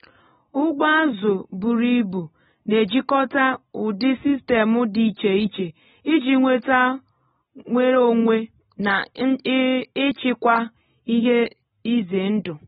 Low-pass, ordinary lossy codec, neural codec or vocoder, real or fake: 14.4 kHz; AAC, 16 kbps; none; real